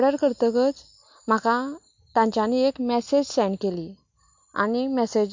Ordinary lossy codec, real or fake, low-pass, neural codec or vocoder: MP3, 48 kbps; real; 7.2 kHz; none